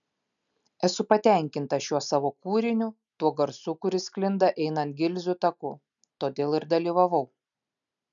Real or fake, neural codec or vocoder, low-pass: real; none; 7.2 kHz